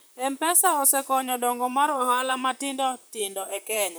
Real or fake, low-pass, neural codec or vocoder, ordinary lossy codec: fake; none; vocoder, 44.1 kHz, 128 mel bands, Pupu-Vocoder; none